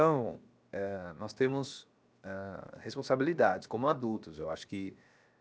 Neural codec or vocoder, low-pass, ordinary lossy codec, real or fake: codec, 16 kHz, 0.7 kbps, FocalCodec; none; none; fake